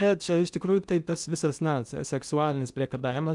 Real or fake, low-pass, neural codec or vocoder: fake; 10.8 kHz; codec, 16 kHz in and 24 kHz out, 0.8 kbps, FocalCodec, streaming, 65536 codes